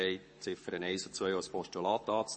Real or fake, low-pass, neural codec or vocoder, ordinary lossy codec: fake; 10.8 kHz; codec, 44.1 kHz, 7.8 kbps, Pupu-Codec; MP3, 32 kbps